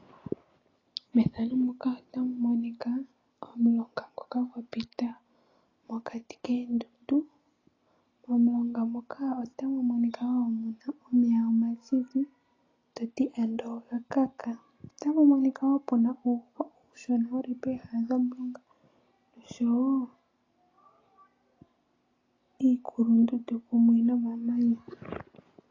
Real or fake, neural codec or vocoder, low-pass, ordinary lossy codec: real; none; 7.2 kHz; AAC, 32 kbps